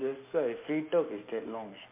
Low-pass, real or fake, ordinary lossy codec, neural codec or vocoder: 3.6 kHz; real; MP3, 32 kbps; none